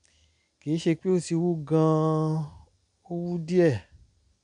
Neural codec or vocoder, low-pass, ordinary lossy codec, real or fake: none; 9.9 kHz; none; real